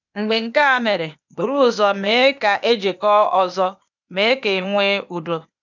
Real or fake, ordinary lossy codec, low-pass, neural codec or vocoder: fake; none; 7.2 kHz; codec, 16 kHz, 0.8 kbps, ZipCodec